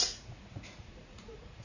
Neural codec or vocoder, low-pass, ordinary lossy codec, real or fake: vocoder, 44.1 kHz, 80 mel bands, Vocos; 7.2 kHz; none; fake